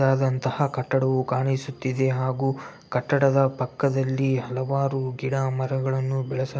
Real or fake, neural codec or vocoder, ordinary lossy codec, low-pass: real; none; none; none